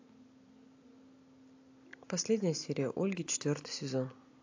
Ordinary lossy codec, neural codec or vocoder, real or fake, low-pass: AAC, 48 kbps; none; real; 7.2 kHz